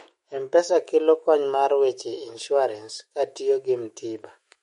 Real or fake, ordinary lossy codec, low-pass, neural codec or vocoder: fake; MP3, 48 kbps; 19.8 kHz; autoencoder, 48 kHz, 128 numbers a frame, DAC-VAE, trained on Japanese speech